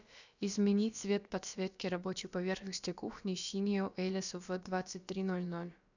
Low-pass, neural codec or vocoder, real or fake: 7.2 kHz; codec, 16 kHz, about 1 kbps, DyCAST, with the encoder's durations; fake